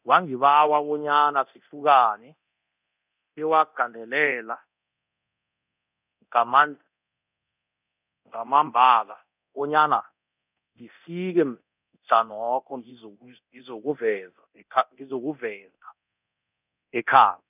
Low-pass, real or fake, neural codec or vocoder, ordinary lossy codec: 3.6 kHz; fake; codec, 24 kHz, 0.9 kbps, DualCodec; none